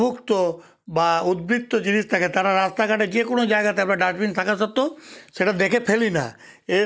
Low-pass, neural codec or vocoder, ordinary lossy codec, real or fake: none; none; none; real